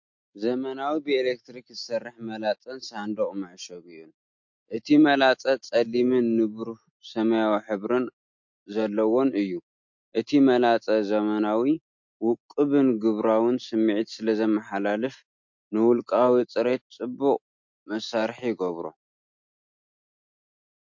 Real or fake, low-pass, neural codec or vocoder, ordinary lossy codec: real; 7.2 kHz; none; MP3, 48 kbps